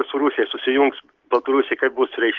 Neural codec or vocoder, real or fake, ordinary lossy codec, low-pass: none; real; Opus, 16 kbps; 7.2 kHz